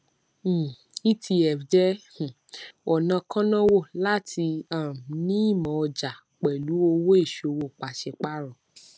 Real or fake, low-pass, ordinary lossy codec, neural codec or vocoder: real; none; none; none